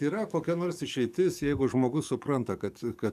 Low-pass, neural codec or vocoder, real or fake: 14.4 kHz; codec, 44.1 kHz, 7.8 kbps, DAC; fake